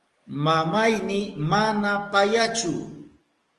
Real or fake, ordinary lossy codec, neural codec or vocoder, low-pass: fake; Opus, 24 kbps; codec, 44.1 kHz, 7.8 kbps, DAC; 10.8 kHz